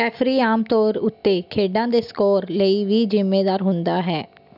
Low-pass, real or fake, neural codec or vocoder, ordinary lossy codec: 5.4 kHz; real; none; none